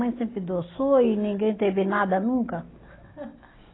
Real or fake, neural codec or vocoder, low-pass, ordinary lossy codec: real; none; 7.2 kHz; AAC, 16 kbps